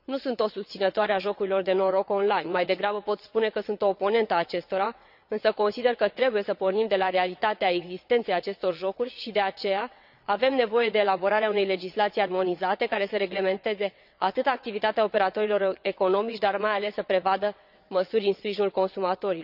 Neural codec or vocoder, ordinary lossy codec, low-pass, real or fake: vocoder, 22.05 kHz, 80 mel bands, WaveNeXt; none; 5.4 kHz; fake